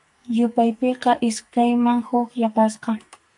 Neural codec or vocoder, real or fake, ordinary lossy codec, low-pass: codec, 44.1 kHz, 2.6 kbps, SNAC; fake; MP3, 96 kbps; 10.8 kHz